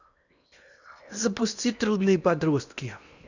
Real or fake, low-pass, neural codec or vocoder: fake; 7.2 kHz; codec, 16 kHz in and 24 kHz out, 0.8 kbps, FocalCodec, streaming, 65536 codes